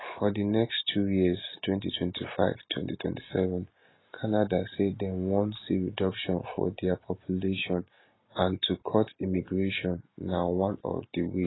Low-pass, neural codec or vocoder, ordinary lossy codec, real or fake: 7.2 kHz; none; AAC, 16 kbps; real